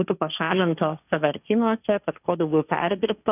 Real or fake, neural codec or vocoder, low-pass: fake; codec, 16 kHz, 1.1 kbps, Voila-Tokenizer; 3.6 kHz